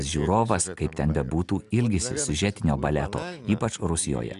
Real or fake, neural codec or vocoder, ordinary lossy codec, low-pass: real; none; MP3, 96 kbps; 10.8 kHz